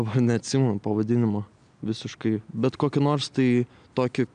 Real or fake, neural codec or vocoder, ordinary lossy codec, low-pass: real; none; MP3, 96 kbps; 9.9 kHz